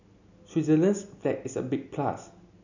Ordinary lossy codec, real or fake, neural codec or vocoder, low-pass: none; real; none; 7.2 kHz